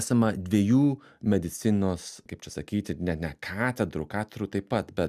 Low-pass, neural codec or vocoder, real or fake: 14.4 kHz; none; real